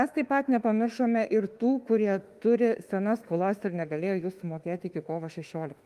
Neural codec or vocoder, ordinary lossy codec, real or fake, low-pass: autoencoder, 48 kHz, 32 numbers a frame, DAC-VAE, trained on Japanese speech; Opus, 32 kbps; fake; 14.4 kHz